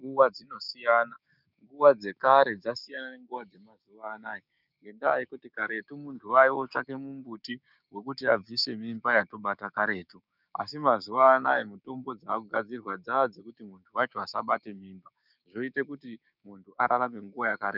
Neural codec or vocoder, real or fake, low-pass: codec, 16 kHz, 6 kbps, DAC; fake; 5.4 kHz